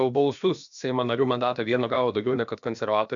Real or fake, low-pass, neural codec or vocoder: fake; 7.2 kHz; codec, 16 kHz, about 1 kbps, DyCAST, with the encoder's durations